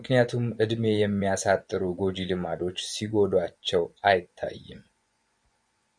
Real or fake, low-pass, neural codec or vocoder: fake; 9.9 kHz; vocoder, 24 kHz, 100 mel bands, Vocos